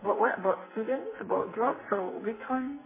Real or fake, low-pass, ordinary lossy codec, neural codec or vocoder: fake; 3.6 kHz; MP3, 16 kbps; codec, 44.1 kHz, 2.6 kbps, SNAC